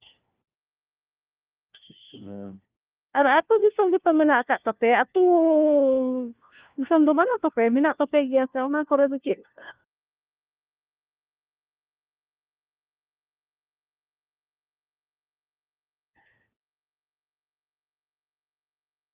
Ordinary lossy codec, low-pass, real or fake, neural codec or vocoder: Opus, 32 kbps; 3.6 kHz; fake; codec, 16 kHz, 1 kbps, FunCodec, trained on LibriTTS, 50 frames a second